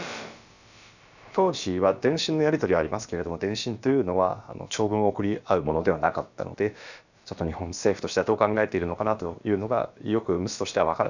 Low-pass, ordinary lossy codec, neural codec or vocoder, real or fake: 7.2 kHz; none; codec, 16 kHz, about 1 kbps, DyCAST, with the encoder's durations; fake